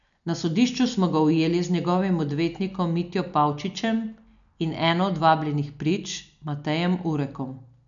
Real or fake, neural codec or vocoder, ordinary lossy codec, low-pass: real; none; none; 7.2 kHz